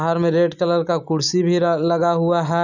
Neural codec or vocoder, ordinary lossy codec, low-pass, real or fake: none; none; 7.2 kHz; real